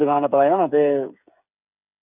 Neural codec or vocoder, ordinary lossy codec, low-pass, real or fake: codec, 16 kHz, 8 kbps, FreqCodec, smaller model; none; 3.6 kHz; fake